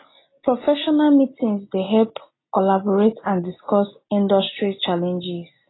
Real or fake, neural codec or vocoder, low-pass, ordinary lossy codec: real; none; 7.2 kHz; AAC, 16 kbps